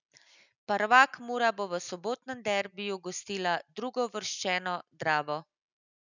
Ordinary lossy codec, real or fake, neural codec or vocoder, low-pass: none; real; none; 7.2 kHz